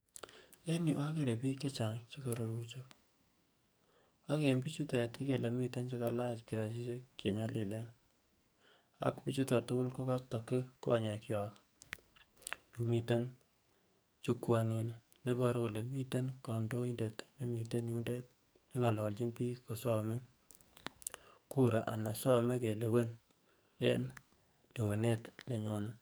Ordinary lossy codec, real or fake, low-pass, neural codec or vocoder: none; fake; none; codec, 44.1 kHz, 2.6 kbps, SNAC